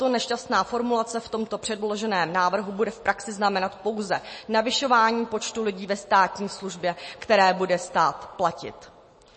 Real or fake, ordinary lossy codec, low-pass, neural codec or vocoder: real; MP3, 32 kbps; 10.8 kHz; none